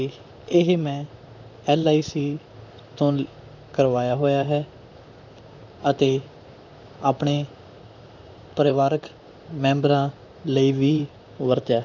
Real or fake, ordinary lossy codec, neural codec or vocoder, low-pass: fake; none; vocoder, 44.1 kHz, 128 mel bands, Pupu-Vocoder; 7.2 kHz